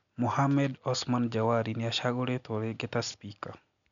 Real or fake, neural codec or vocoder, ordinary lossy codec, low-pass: real; none; none; 7.2 kHz